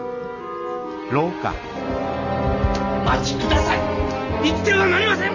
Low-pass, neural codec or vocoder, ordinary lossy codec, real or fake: 7.2 kHz; none; none; real